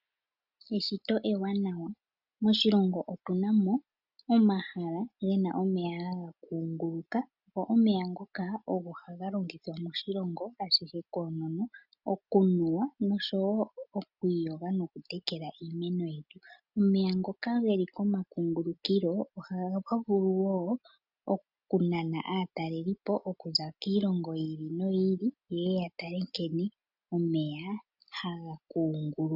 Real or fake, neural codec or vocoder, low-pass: real; none; 5.4 kHz